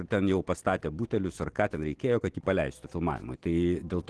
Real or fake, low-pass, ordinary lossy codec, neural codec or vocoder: real; 10.8 kHz; Opus, 16 kbps; none